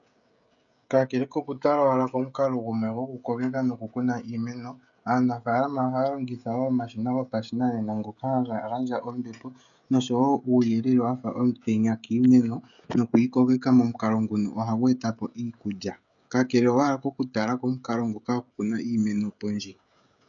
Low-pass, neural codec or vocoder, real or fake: 7.2 kHz; codec, 16 kHz, 16 kbps, FreqCodec, smaller model; fake